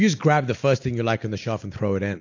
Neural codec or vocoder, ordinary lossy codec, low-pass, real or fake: none; AAC, 48 kbps; 7.2 kHz; real